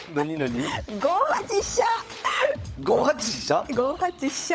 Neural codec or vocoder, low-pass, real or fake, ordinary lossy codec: codec, 16 kHz, 16 kbps, FunCodec, trained on LibriTTS, 50 frames a second; none; fake; none